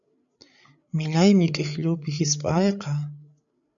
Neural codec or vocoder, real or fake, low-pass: codec, 16 kHz, 8 kbps, FreqCodec, larger model; fake; 7.2 kHz